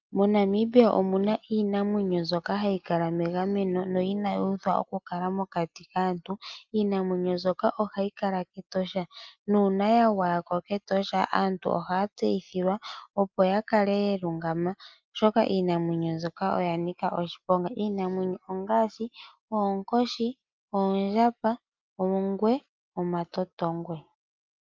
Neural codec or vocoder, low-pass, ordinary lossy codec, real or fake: none; 7.2 kHz; Opus, 24 kbps; real